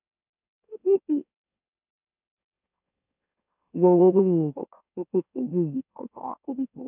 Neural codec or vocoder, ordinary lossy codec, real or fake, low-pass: autoencoder, 44.1 kHz, a latent of 192 numbers a frame, MeloTTS; none; fake; 3.6 kHz